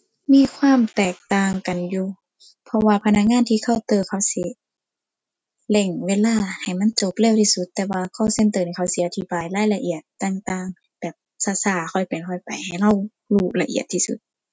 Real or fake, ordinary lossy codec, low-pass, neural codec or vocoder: real; none; none; none